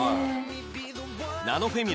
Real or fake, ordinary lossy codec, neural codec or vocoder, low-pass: real; none; none; none